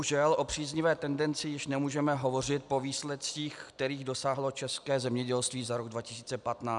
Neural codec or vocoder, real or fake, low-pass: none; real; 10.8 kHz